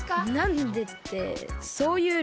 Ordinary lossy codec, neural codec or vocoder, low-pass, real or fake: none; none; none; real